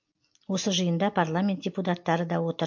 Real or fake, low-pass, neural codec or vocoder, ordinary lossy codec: real; 7.2 kHz; none; MP3, 48 kbps